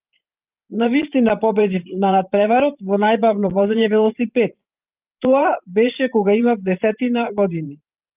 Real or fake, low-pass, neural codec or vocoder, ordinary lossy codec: fake; 3.6 kHz; vocoder, 44.1 kHz, 128 mel bands every 512 samples, BigVGAN v2; Opus, 24 kbps